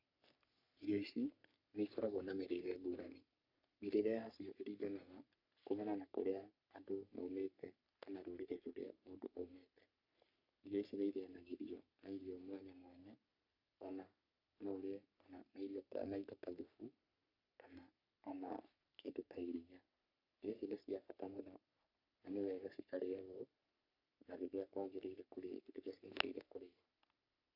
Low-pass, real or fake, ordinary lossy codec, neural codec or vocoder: 5.4 kHz; fake; none; codec, 44.1 kHz, 3.4 kbps, Pupu-Codec